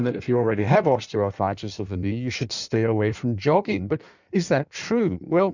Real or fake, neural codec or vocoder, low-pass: fake; codec, 16 kHz in and 24 kHz out, 1.1 kbps, FireRedTTS-2 codec; 7.2 kHz